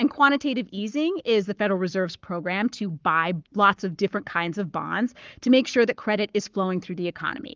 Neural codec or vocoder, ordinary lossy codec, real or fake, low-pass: codec, 44.1 kHz, 7.8 kbps, Pupu-Codec; Opus, 32 kbps; fake; 7.2 kHz